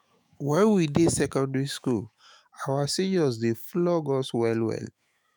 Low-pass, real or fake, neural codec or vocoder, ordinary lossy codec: none; fake; autoencoder, 48 kHz, 128 numbers a frame, DAC-VAE, trained on Japanese speech; none